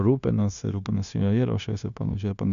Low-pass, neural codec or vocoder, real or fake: 7.2 kHz; codec, 16 kHz, 0.9 kbps, LongCat-Audio-Codec; fake